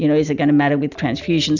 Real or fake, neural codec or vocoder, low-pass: real; none; 7.2 kHz